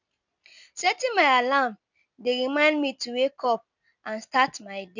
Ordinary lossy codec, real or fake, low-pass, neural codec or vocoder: none; real; 7.2 kHz; none